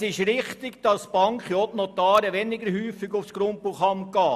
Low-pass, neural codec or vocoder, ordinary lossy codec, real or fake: 14.4 kHz; none; none; real